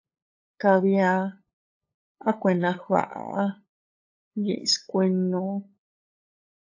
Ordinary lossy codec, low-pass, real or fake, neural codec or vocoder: AAC, 48 kbps; 7.2 kHz; fake; codec, 16 kHz, 8 kbps, FunCodec, trained on LibriTTS, 25 frames a second